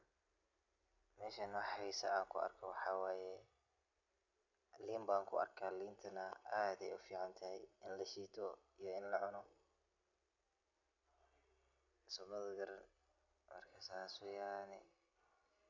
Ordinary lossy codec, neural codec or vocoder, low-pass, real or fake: none; none; 7.2 kHz; real